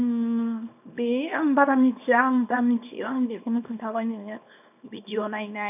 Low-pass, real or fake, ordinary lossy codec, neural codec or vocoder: 3.6 kHz; fake; none; codec, 24 kHz, 0.9 kbps, WavTokenizer, small release